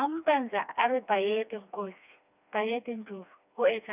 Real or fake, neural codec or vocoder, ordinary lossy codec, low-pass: fake; codec, 16 kHz, 2 kbps, FreqCodec, smaller model; none; 3.6 kHz